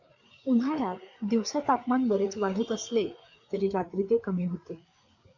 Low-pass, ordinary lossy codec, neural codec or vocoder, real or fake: 7.2 kHz; MP3, 48 kbps; codec, 16 kHz, 4 kbps, FreqCodec, larger model; fake